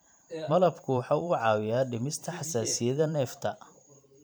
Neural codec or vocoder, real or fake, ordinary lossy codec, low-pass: vocoder, 44.1 kHz, 128 mel bands every 512 samples, BigVGAN v2; fake; none; none